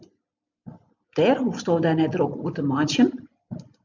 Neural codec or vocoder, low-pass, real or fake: none; 7.2 kHz; real